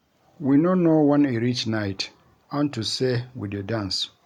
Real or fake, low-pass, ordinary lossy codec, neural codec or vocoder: real; 19.8 kHz; MP3, 96 kbps; none